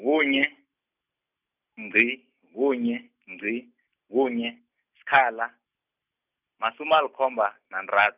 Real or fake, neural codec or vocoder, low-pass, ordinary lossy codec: real; none; 3.6 kHz; none